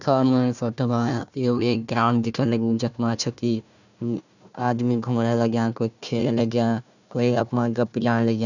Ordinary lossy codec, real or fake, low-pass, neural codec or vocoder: none; fake; 7.2 kHz; codec, 16 kHz, 1 kbps, FunCodec, trained on Chinese and English, 50 frames a second